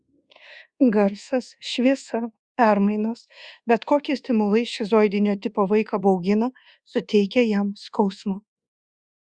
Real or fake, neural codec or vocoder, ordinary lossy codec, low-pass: fake; codec, 24 kHz, 1.2 kbps, DualCodec; Opus, 64 kbps; 9.9 kHz